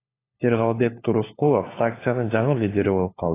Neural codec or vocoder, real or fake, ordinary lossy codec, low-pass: codec, 16 kHz, 4 kbps, FunCodec, trained on LibriTTS, 50 frames a second; fake; AAC, 16 kbps; 3.6 kHz